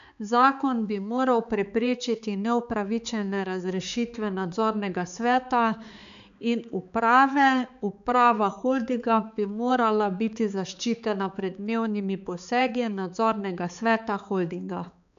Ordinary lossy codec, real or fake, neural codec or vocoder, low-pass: none; fake; codec, 16 kHz, 4 kbps, X-Codec, HuBERT features, trained on balanced general audio; 7.2 kHz